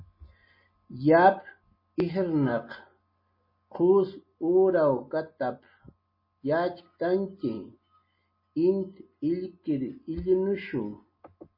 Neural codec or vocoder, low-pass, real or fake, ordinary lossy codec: none; 5.4 kHz; real; MP3, 24 kbps